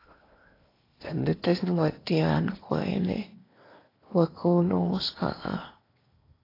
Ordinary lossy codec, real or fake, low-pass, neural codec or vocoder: AAC, 24 kbps; fake; 5.4 kHz; codec, 16 kHz in and 24 kHz out, 0.8 kbps, FocalCodec, streaming, 65536 codes